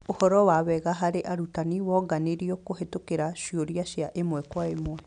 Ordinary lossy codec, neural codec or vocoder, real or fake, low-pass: none; none; real; 9.9 kHz